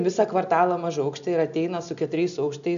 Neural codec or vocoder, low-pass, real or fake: none; 7.2 kHz; real